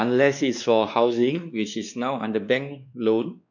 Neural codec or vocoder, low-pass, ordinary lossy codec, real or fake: codec, 16 kHz, 2 kbps, X-Codec, WavLM features, trained on Multilingual LibriSpeech; 7.2 kHz; none; fake